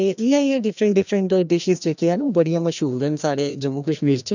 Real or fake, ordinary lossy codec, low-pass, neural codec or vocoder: fake; none; 7.2 kHz; codec, 16 kHz, 1 kbps, FreqCodec, larger model